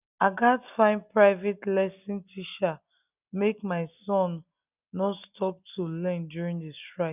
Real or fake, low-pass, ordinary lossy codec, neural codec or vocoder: real; 3.6 kHz; none; none